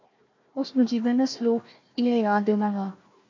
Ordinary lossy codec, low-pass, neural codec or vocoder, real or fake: MP3, 48 kbps; 7.2 kHz; codec, 16 kHz, 1 kbps, FunCodec, trained on Chinese and English, 50 frames a second; fake